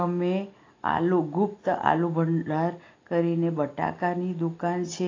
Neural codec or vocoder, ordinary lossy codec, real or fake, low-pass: none; AAC, 32 kbps; real; 7.2 kHz